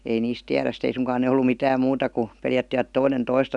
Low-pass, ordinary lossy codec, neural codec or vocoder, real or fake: 10.8 kHz; none; none; real